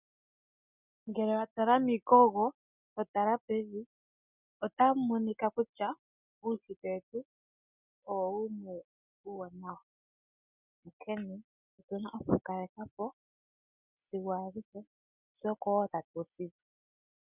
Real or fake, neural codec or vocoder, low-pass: real; none; 3.6 kHz